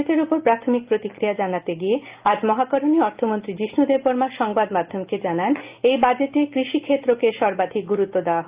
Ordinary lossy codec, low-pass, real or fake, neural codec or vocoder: Opus, 32 kbps; 3.6 kHz; real; none